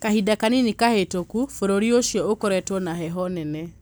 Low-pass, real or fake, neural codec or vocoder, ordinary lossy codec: none; real; none; none